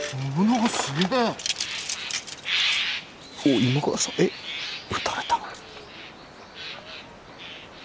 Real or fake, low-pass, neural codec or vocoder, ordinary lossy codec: real; none; none; none